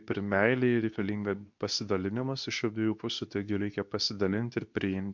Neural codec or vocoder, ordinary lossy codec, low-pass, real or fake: codec, 24 kHz, 0.9 kbps, WavTokenizer, medium speech release version 2; MP3, 64 kbps; 7.2 kHz; fake